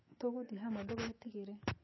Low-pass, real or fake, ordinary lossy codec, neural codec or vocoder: 7.2 kHz; fake; MP3, 24 kbps; autoencoder, 48 kHz, 128 numbers a frame, DAC-VAE, trained on Japanese speech